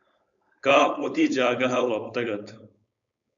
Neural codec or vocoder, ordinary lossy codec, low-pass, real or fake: codec, 16 kHz, 4.8 kbps, FACodec; MP3, 96 kbps; 7.2 kHz; fake